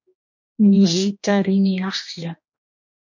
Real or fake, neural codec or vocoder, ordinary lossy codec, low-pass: fake; codec, 16 kHz, 1 kbps, X-Codec, HuBERT features, trained on general audio; MP3, 48 kbps; 7.2 kHz